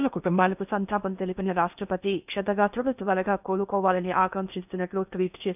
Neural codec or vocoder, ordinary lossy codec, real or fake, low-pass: codec, 16 kHz in and 24 kHz out, 0.8 kbps, FocalCodec, streaming, 65536 codes; none; fake; 3.6 kHz